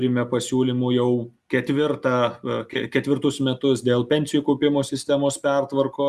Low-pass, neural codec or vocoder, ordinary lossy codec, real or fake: 14.4 kHz; none; Opus, 64 kbps; real